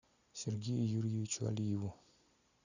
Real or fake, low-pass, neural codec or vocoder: real; 7.2 kHz; none